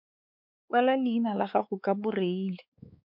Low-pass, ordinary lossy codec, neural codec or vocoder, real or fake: 5.4 kHz; MP3, 48 kbps; codec, 16 kHz, 4 kbps, X-Codec, WavLM features, trained on Multilingual LibriSpeech; fake